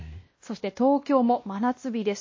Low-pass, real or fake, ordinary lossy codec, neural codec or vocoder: 7.2 kHz; fake; MP3, 32 kbps; codec, 16 kHz, 1 kbps, X-Codec, WavLM features, trained on Multilingual LibriSpeech